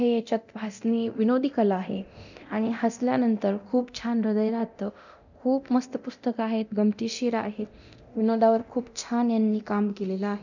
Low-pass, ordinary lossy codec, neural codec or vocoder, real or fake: 7.2 kHz; none; codec, 24 kHz, 0.9 kbps, DualCodec; fake